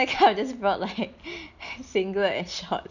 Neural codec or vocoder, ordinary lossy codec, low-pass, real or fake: none; none; 7.2 kHz; real